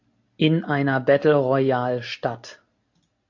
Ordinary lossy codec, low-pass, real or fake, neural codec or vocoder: AAC, 48 kbps; 7.2 kHz; real; none